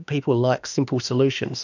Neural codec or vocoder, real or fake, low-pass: autoencoder, 48 kHz, 32 numbers a frame, DAC-VAE, trained on Japanese speech; fake; 7.2 kHz